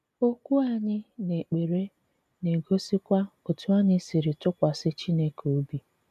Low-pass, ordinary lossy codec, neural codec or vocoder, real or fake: 14.4 kHz; none; none; real